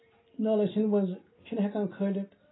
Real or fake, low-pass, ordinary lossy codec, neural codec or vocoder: real; 7.2 kHz; AAC, 16 kbps; none